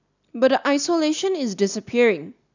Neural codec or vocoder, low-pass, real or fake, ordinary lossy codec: none; 7.2 kHz; real; none